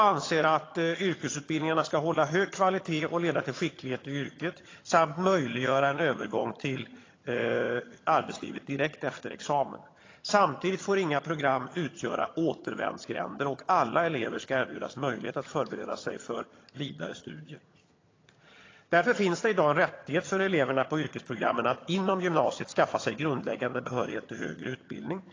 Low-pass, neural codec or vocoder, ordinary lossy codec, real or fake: 7.2 kHz; vocoder, 22.05 kHz, 80 mel bands, HiFi-GAN; AAC, 32 kbps; fake